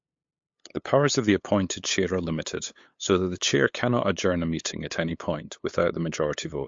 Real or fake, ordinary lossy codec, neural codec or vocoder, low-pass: fake; MP3, 48 kbps; codec, 16 kHz, 8 kbps, FunCodec, trained on LibriTTS, 25 frames a second; 7.2 kHz